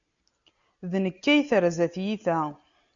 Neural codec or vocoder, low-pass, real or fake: none; 7.2 kHz; real